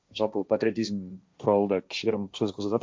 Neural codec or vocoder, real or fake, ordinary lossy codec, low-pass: codec, 16 kHz, 1.1 kbps, Voila-Tokenizer; fake; none; none